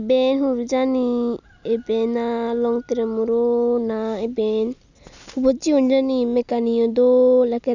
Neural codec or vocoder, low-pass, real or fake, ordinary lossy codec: none; 7.2 kHz; real; none